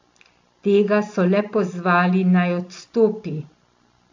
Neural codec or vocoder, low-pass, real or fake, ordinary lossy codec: none; 7.2 kHz; real; AAC, 48 kbps